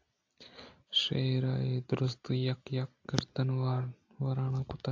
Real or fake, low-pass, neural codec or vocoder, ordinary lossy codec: real; 7.2 kHz; none; AAC, 48 kbps